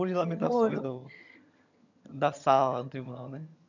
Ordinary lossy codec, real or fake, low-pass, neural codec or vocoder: none; fake; 7.2 kHz; vocoder, 22.05 kHz, 80 mel bands, HiFi-GAN